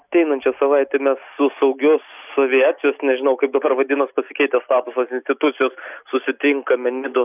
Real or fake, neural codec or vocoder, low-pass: real; none; 3.6 kHz